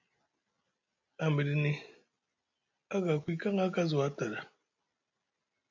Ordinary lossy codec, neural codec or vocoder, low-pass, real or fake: AAC, 48 kbps; none; 7.2 kHz; real